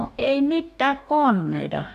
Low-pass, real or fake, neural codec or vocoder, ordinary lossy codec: 14.4 kHz; fake; codec, 44.1 kHz, 2.6 kbps, DAC; none